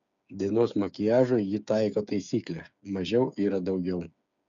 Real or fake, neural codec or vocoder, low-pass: fake; codec, 16 kHz, 8 kbps, FreqCodec, smaller model; 7.2 kHz